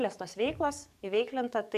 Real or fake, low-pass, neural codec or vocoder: fake; 14.4 kHz; codec, 44.1 kHz, 7.8 kbps, DAC